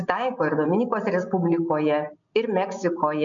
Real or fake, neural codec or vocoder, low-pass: real; none; 7.2 kHz